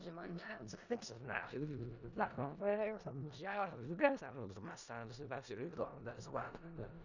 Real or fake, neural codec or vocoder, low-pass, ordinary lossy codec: fake; codec, 16 kHz in and 24 kHz out, 0.4 kbps, LongCat-Audio-Codec, four codebook decoder; 7.2 kHz; none